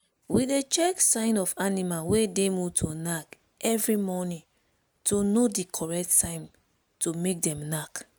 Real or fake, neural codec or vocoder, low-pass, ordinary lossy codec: real; none; none; none